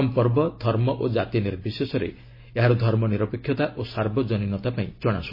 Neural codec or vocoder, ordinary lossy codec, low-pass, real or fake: none; MP3, 24 kbps; 5.4 kHz; real